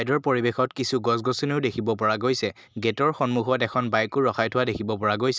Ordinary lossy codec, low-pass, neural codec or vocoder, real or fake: none; none; none; real